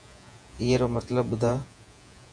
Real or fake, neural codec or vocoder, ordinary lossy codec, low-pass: fake; vocoder, 48 kHz, 128 mel bands, Vocos; MP3, 96 kbps; 9.9 kHz